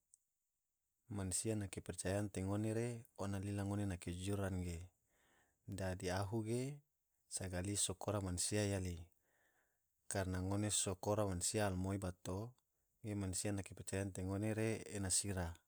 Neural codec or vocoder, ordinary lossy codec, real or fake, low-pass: none; none; real; none